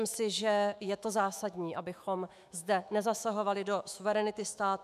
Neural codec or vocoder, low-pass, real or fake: autoencoder, 48 kHz, 128 numbers a frame, DAC-VAE, trained on Japanese speech; 14.4 kHz; fake